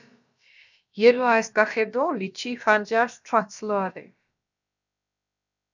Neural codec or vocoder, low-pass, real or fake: codec, 16 kHz, about 1 kbps, DyCAST, with the encoder's durations; 7.2 kHz; fake